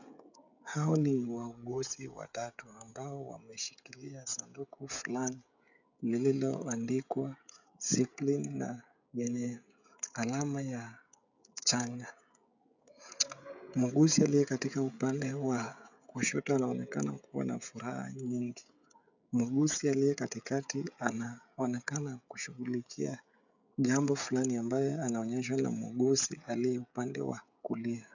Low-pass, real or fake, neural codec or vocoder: 7.2 kHz; fake; codec, 16 kHz, 16 kbps, FreqCodec, smaller model